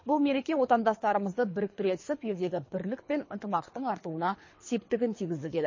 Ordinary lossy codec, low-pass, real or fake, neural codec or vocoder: MP3, 32 kbps; 7.2 kHz; fake; codec, 24 kHz, 3 kbps, HILCodec